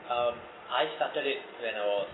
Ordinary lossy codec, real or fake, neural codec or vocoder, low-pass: AAC, 16 kbps; real; none; 7.2 kHz